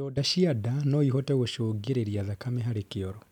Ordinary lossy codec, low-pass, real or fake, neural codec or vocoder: none; 19.8 kHz; real; none